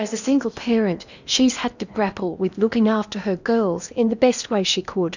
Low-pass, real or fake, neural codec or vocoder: 7.2 kHz; fake; codec, 16 kHz in and 24 kHz out, 0.8 kbps, FocalCodec, streaming, 65536 codes